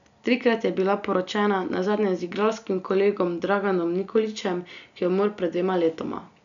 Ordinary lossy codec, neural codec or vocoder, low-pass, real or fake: none; none; 7.2 kHz; real